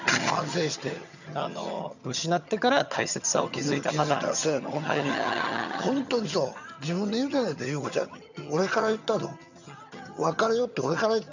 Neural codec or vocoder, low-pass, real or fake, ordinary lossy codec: vocoder, 22.05 kHz, 80 mel bands, HiFi-GAN; 7.2 kHz; fake; none